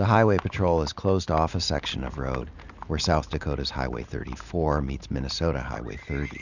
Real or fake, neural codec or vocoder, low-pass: real; none; 7.2 kHz